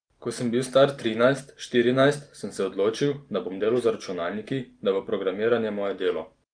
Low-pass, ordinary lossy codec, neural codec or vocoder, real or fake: 9.9 kHz; Opus, 32 kbps; none; real